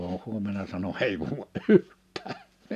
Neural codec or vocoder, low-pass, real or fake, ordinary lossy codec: none; 14.4 kHz; real; MP3, 96 kbps